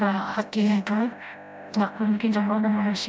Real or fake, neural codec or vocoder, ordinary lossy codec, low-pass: fake; codec, 16 kHz, 0.5 kbps, FreqCodec, smaller model; none; none